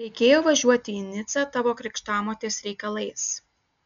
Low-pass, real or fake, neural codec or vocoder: 7.2 kHz; real; none